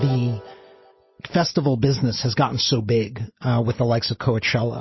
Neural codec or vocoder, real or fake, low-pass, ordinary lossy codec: none; real; 7.2 kHz; MP3, 24 kbps